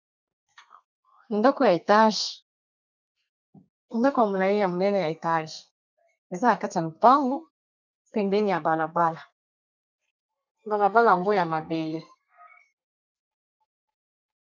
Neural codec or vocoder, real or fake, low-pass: codec, 32 kHz, 1.9 kbps, SNAC; fake; 7.2 kHz